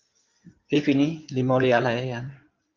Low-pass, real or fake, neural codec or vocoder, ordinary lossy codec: 7.2 kHz; fake; codec, 16 kHz in and 24 kHz out, 2.2 kbps, FireRedTTS-2 codec; Opus, 32 kbps